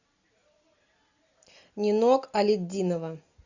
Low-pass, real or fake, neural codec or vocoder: 7.2 kHz; real; none